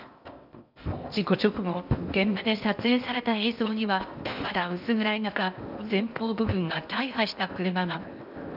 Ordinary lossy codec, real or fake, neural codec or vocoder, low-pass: none; fake; codec, 16 kHz in and 24 kHz out, 0.8 kbps, FocalCodec, streaming, 65536 codes; 5.4 kHz